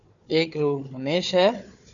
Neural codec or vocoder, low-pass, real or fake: codec, 16 kHz, 4 kbps, FunCodec, trained on Chinese and English, 50 frames a second; 7.2 kHz; fake